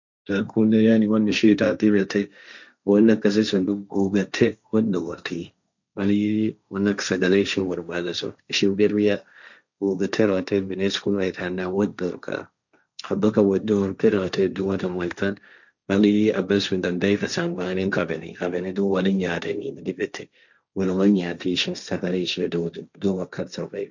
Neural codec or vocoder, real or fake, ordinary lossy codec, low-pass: codec, 16 kHz, 1.1 kbps, Voila-Tokenizer; fake; none; 7.2 kHz